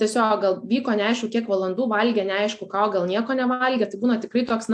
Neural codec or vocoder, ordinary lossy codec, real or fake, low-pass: none; AAC, 64 kbps; real; 9.9 kHz